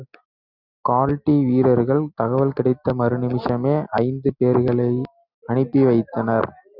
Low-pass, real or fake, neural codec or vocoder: 5.4 kHz; real; none